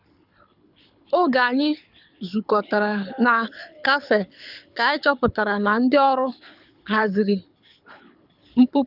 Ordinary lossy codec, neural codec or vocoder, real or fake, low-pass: none; codec, 24 kHz, 6 kbps, HILCodec; fake; 5.4 kHz